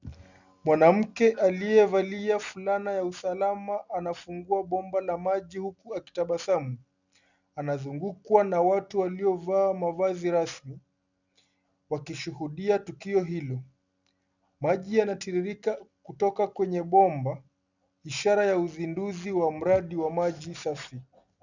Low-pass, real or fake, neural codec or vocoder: 7.2 kHz; real; none